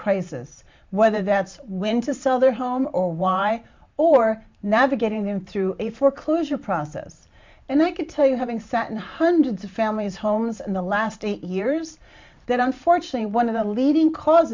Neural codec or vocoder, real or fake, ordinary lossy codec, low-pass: vocoder, 44.1 kHz, 128 mel bands every 512 samples, BigVGAN v2; fake; MP3, 64 kbps; 7.2 kHz